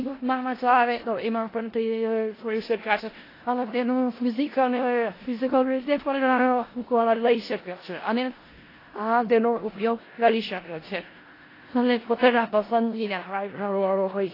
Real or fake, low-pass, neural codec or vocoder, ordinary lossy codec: fake; 5.4 kHz; codec, 16 kHz in and 24 kHz out, 0.4 kbps, LongCat-Audio-Codec, four codebook decoder; AAC, 24 kbps